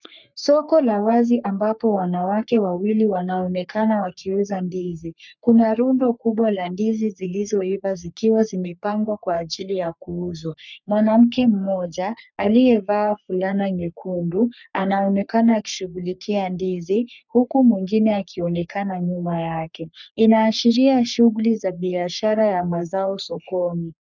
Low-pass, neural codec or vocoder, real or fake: 7.2 kHz; codec, 44.1 kHz, 3.4 kbps, Pupu-Codec; fake